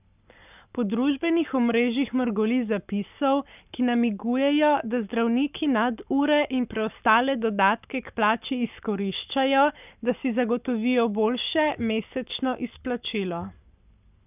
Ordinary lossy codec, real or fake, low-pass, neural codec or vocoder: none; fake; 3.6 kHz; codec, 44.1 kHz, 7.8 kbps, Pupu-Codec